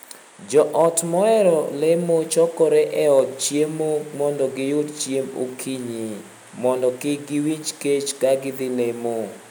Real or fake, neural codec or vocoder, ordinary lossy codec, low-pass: real; none; none; none